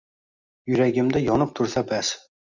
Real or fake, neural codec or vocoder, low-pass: real; none; 7.2 kHz